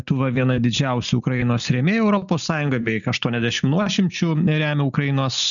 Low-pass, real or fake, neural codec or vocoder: 7.2 kHz; real; none